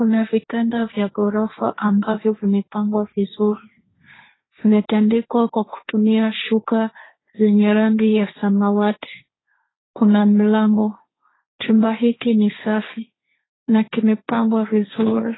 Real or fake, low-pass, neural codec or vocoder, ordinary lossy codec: fake; 7.2 kHz; codec, 16 kHz, 1.1 kbps, Voila-Tokenizer; AAC, 16 kbps